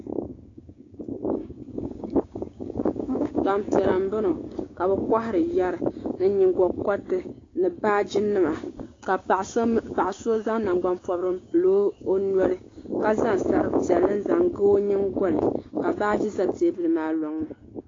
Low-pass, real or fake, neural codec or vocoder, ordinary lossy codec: 7.2 kHz; fake; codec, 16 kHz, 6 kbps, DAC; AAC, 32 kbps